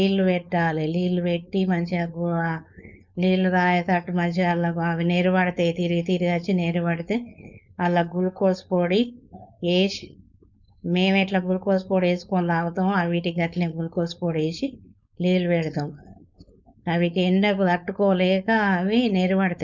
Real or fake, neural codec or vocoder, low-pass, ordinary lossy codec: fake; codec, 16 kHz, 4.8 kbps, FACodec; 7.2 kHz; none